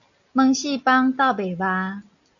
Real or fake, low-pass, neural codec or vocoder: real; 7.2 kHz; none